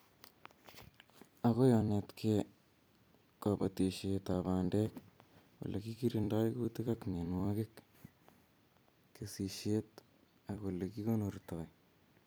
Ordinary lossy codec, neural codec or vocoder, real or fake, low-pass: none; vocoder, 44.1 kHz, 128 mel bands every 256 samples, BigVGAN v2; fake; none